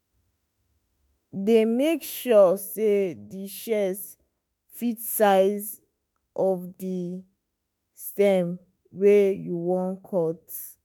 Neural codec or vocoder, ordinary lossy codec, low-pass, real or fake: autoencoder, 48 kHz, 32 numbers a frame, DAC-VAE, trained on Japanese speech; none; none; fake